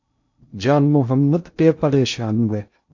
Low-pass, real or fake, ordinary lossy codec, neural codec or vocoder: 7.2 kHz; fake; AAC, 48 kbps; codec, 16 kHz in and 24 kHz out, 0.6 kbps, FocalCodec, streaming, 2048 codes